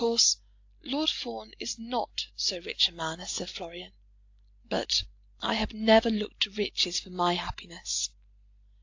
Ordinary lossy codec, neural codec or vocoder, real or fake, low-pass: AAC, 48 kbps; none; real; 7.2 kHz